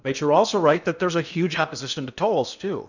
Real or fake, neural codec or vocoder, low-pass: fake; codec, 16 kHz in and 24 kHz out, 0.8 kbps, FocalCodec, streaming, 65536 codes; 7.2 kHz